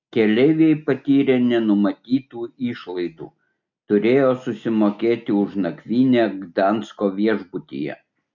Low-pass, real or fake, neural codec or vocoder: 7.2 kHz; real; none